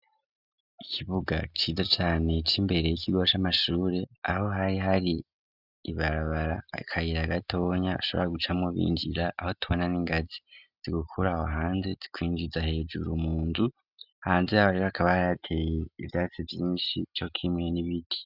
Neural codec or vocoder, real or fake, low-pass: none; real; 5.4 kHz